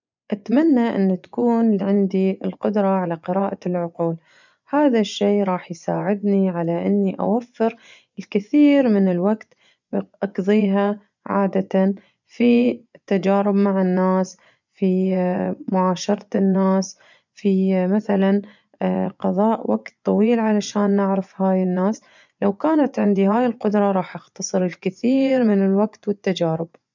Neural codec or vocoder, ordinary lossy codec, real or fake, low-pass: vocoder, 24 kHz, 100 mel bands, Vocos; none; fake; 7.2 kHz